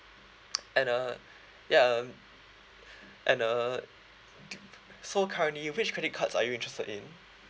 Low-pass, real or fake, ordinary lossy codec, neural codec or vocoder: none; real; none; none